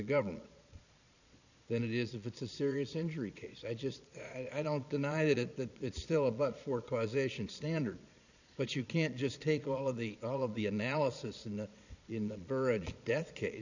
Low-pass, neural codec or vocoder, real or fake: 7.2 kHz; vocoder, 22.05 kHz, 80 mel bands, Vocos; fake